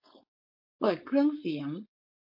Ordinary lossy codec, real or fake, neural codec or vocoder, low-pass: MP3, 32 kbps; fake; codec, 16 kHz, 4.8 kbps, FACodec; 5.4 kHz